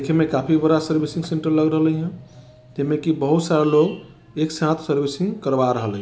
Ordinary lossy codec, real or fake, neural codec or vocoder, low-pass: none; real; none; none